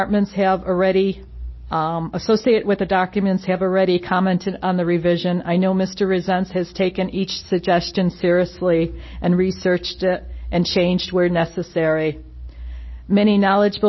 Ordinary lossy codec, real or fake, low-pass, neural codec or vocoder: MP3, 24 kbps; real; 7.2 kHz; none